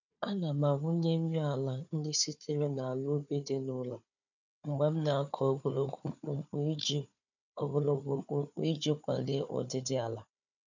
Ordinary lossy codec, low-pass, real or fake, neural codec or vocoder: none; 7.2 kHz; fake; codec, 16 kHz, 4 kbps, FunCodec, trained on Chinese and English, 50 frames a second